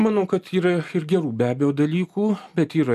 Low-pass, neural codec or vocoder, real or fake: 14.4 kHz; none; real